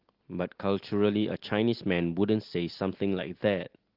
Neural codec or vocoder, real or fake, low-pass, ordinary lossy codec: none; real; 5.4 kHz; Opus, 16 kbps